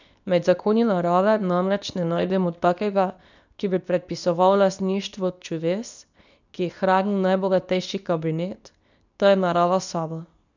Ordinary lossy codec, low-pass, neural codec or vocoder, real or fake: none; 7.2 kHz; codec, 24 kHz, 0.9 kbps, WavTokenizer, medium speech release version 2; fake